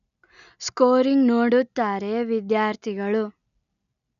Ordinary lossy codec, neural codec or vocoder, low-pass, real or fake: none; none; 7.2 kHz; real